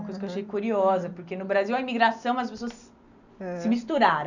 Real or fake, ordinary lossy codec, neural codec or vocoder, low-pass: real; none; none; 7.2 kHz